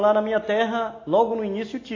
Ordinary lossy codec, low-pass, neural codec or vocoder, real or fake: AAC, 32 kbps; 7.2 kHz; none; real